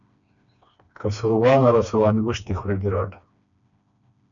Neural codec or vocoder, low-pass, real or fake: codec, 16 kHz, 2 kbps, FreqCodec, smaller model; 7.2 kHz; fake